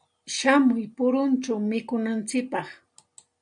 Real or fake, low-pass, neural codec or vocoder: real; 9.9 kHz; none